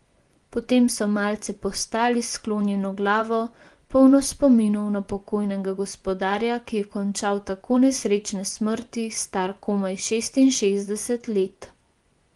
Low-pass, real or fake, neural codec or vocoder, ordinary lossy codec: 10.8 kHz; fake; vocoder, 24 kHz, 100 mel bands, Vocos; Opus, 24 kbps